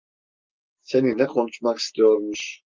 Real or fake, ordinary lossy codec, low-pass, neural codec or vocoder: real; Opus, 24 kbps; 7.2 kHz; none